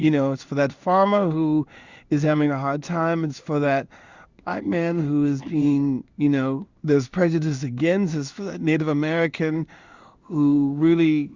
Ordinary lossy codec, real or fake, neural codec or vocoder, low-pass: Opus, 64 kbps; fake; codec, 16 kHz in and 24 kHz out, 1 kbps, XY-Tokenizer; 7.2 kHz